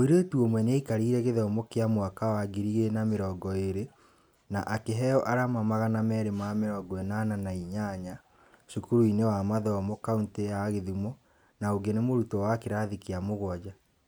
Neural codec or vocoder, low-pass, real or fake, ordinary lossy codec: none; none; real; none